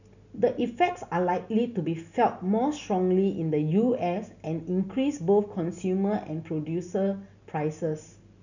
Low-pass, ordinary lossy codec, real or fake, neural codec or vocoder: 7.2 kHz; none; real; none